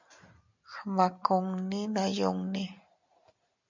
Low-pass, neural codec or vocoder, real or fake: 7.2 kHz; none; real